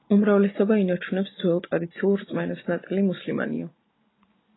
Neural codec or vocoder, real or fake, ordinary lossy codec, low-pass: none; real; AAC, 16 kbps; 7.2 kHz